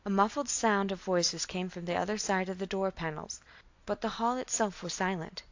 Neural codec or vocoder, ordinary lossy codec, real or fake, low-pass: none; AAC, 48 kbps; real; 7.2 kHz